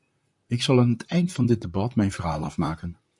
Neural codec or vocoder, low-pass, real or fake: vocoder, 44.1 kHz, 128 mel bands, Pupu-Vocoder; 10.8 kHz; fake